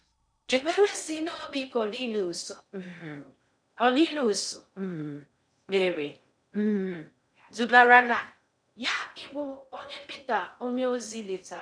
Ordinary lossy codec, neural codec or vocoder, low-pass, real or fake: none; codec, 16 kHz in and 24 kHz out, 0.6 kbps, FocalCodec, streaming, 2048 codes; 9.9 kHz; fake